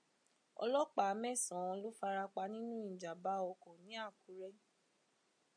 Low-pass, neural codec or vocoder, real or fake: 9.9 kHz; none; real